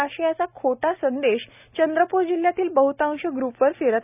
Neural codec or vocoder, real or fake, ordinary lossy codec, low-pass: none; real; none; 3.6 kHz